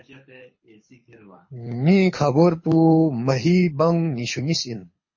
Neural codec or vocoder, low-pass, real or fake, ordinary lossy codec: codec, 24 kHz, 6 kbps, HILCodec; 7.2 kHz; fake; MP3, 32 kbps